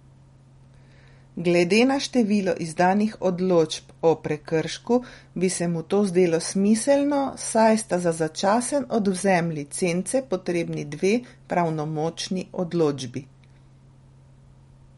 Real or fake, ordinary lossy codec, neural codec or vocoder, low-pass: real; MP3, 48 kbps; none; 19.8 kHz